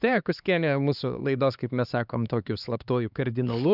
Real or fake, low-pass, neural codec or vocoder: fake; 5.4 kHz; codec, 16 kHz, 2 kbps, X-Codec, HuBERT features, trained on LibriSpeech